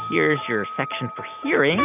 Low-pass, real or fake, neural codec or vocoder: 3.6 kHz; fake; vocoder, 44.1 kHz, 128 mel bands every 256 samples, BigVGAN v2